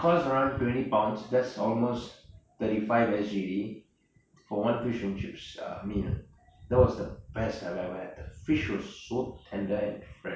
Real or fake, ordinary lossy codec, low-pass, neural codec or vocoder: real; none; none; none